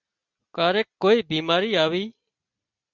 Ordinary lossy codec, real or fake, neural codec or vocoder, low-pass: Opus, 64 kbps; real; none; 7.2 kHz